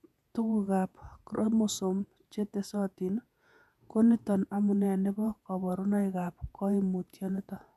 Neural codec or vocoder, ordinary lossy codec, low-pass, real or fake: vocoder, 48 kHz, 128 mel bands, Vocos; none; 14.4 kHz; fake